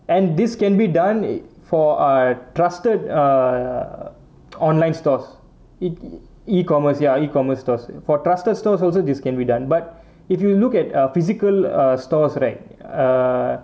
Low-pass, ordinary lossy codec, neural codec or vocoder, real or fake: none; none; none; real